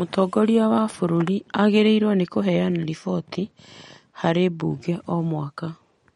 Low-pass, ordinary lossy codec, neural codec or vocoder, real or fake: 19.8 kHz; MP3, 48 kbps; none; real